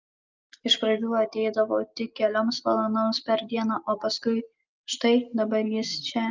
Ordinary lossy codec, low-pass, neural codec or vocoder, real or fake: Opus, 24 kbps; 7.2 kHz; none; real